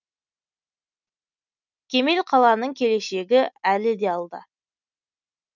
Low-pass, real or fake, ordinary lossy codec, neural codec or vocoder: 7.2 kHz; real; none; none